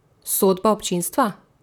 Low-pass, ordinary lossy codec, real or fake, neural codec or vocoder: none; none; fake; vocoder, 44.1 kHz, 128 mel bands, Pupu-Vocoder